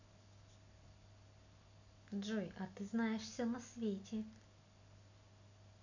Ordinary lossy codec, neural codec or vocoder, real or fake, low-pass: none; none; real; 7.2 kHz